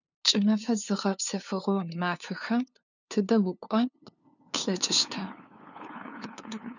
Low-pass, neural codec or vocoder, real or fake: 7.2 kHz; codec, 16 kHz, 2 kbps, FunCodec, trained on LibriTTS, 25 frames a second; fake